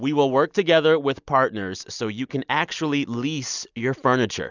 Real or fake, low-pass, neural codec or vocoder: real; 7.2 kHz; none